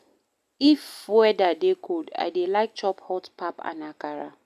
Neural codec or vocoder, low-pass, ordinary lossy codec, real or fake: none; 14.4 kHz; MP3, 96 kbps; real